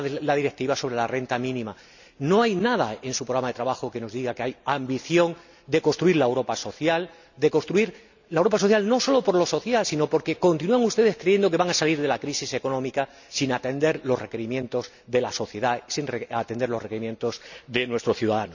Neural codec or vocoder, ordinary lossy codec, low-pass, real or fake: none; none; 7.2 kHz; real